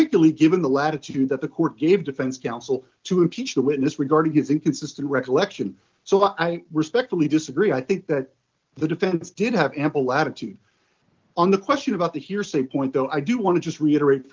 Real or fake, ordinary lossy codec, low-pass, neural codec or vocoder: real; Opus, 32 kbps; 7.2 kHz; none